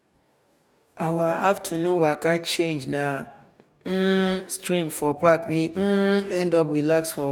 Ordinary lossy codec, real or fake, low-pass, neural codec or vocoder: none; fake; 19.8 kHz; codec, 44.1 kHz, 2.6 kbps, DAC